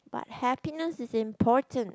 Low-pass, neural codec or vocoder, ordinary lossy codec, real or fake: none; none; none; real